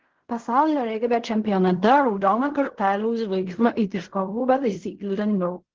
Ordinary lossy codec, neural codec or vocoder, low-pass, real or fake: Opus, 16 kbps; codec, 16 kHz in and 24 kHz out, 0.4 kbps, LongCat-Audio-Codec, fine tuned four codebook decoder; 7.2 kHz; fake